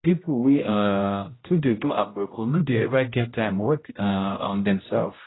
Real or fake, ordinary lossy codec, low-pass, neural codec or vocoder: fake; AAC, 16 kbps; 7.2 kHz; codec, 16 kHz, 0.5 kbps, X-Codec, HuBERT features, trained on general audio